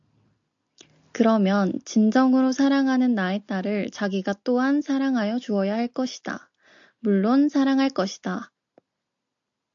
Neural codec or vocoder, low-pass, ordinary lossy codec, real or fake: none; 7.2 kHz; AAC, 64 kbps; real